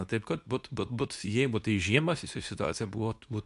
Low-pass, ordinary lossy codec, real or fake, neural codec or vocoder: 10.8 kHz; MP3, 96 kbps; fake; codec, 24 kHz, 0.9 kbps, WavTokenizer, medium speech release version 2